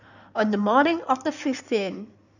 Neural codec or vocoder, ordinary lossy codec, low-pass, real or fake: codec, 16 kHz in and 24 kHz out, 2.2 kbps, FireRedTTS-2 codec; none; 7.2 kHz; fake